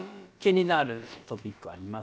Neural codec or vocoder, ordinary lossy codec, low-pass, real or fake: codec, 16 kHz, about 1 kbps, DyCAST, with the encoder's durations; none; none; fake